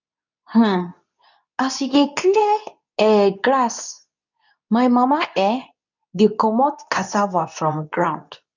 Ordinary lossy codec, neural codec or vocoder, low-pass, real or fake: none; codec, 24 kHz, 0.9 kbps, WavTokenizer, medium speech release version 2; 7.2 kHz; fake